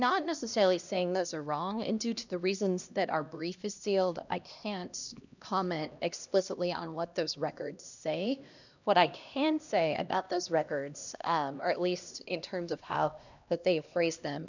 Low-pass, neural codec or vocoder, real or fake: 7.2 kHz; codec, 16 kHz, 1 kbps, X-Codec, HuBERT features, trained on LibriSpeech; fake